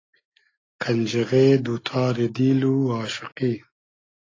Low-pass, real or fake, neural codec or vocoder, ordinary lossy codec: 7.2 kHz; real; none; AAC, 32 kbps